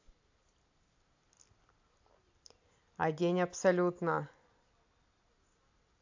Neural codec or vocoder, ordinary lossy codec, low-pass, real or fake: none; AAC, 48 kbps; 7.2 kHz; real